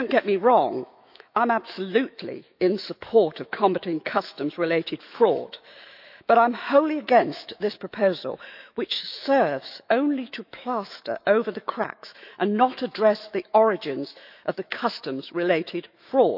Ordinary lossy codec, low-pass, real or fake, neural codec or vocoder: none; 5.4 kHz; fake; autoencoder, 48 kHz, 128 numbers a frame, DAC-VAE, trained on Japanese speech